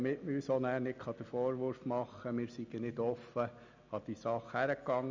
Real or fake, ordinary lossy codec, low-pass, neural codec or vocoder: real; MP3, 64 kbps; 7.2 kHz; none